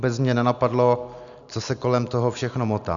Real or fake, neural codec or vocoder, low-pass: real; none; 7.2 kHz